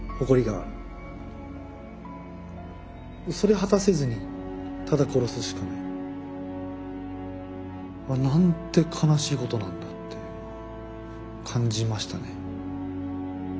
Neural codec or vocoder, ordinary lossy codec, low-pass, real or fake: none; none; none; real